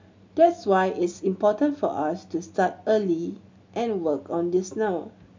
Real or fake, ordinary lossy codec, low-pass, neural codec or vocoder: real; MP3, 64 kbps; 7.2 kHz; none